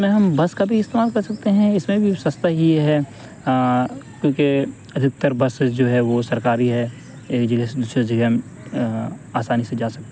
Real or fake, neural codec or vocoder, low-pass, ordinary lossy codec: real; none; none; none